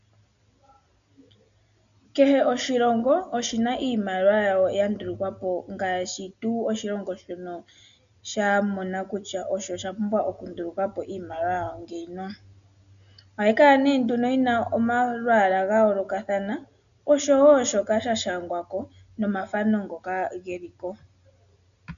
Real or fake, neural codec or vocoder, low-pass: real; none; 7.2 kHz